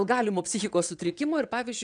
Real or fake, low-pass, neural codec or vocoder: fake; 9.9 kHz; vocoder, 22.05 kHz, 80 mel bands, WaveNeXt